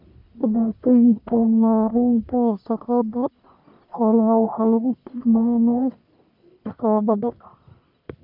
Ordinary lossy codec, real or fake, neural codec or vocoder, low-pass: none; fake; codec, 44.1 kHz, 1.7 kbps, Pupu-Codec; 5.4 kHz